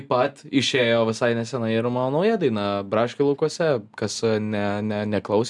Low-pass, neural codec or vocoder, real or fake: 10.8 kHz; none; real